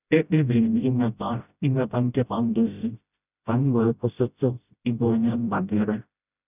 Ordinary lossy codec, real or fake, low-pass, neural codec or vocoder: none; fake; 3.6 kHz; codec, 16 kHz, 0.5 kbps, FreqCodec, smaller model